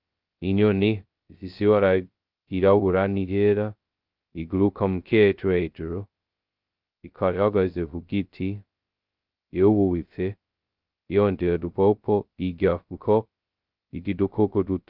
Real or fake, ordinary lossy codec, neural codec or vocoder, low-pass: fake; Opus, 24 kbps; codec, 16 kHz, 0.2 kbps, FocalCodec; 5.4 kHz